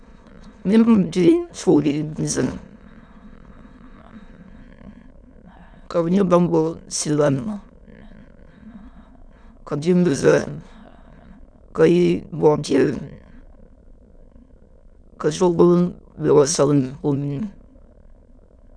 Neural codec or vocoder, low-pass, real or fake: autoencoder, 22.05 kHz, a latent of 192 numbers a frame, VITS, trained on many speakers; 9.9 kHz; fake